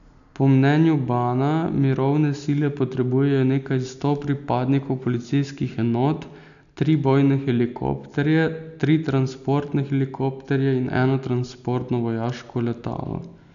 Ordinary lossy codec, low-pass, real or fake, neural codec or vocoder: none; 7.2 kHz; real; none